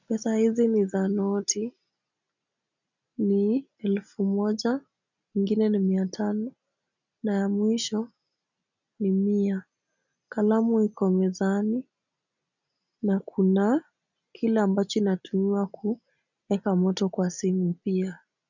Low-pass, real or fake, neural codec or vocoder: 7.2 kHz; real; none